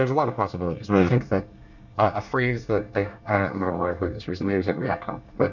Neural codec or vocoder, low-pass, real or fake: codec, 24 kHz, 1 kbps, SNAC; 7.2 kHz; fake